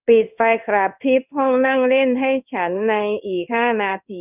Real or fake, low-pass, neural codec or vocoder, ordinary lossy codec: fake; 3.6 kHz; codec, 16 kHz in and 24 kHz out, 1 kbps, XY-Tokenizer; none